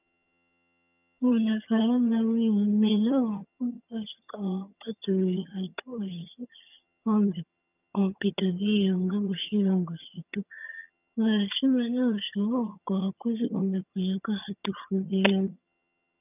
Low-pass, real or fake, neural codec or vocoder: 3.6 kHz; fake; vocoder, 22.05 kHz, 80 mel bands, HiFi-GAN